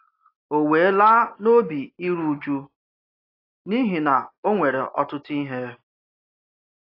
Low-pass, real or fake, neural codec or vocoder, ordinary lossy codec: 5.4 kHz; real; none; AAC, 32 kbps